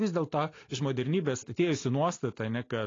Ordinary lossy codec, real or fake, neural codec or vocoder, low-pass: AAC, 32 kbps; real; none; 7.2 kHz